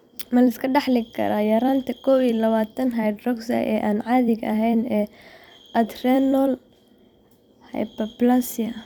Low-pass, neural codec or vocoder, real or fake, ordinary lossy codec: 19.8 kHz; vocoder, 44.1 kHz, 128 mel bands every 256 samples, BigVGAN v2; fake; none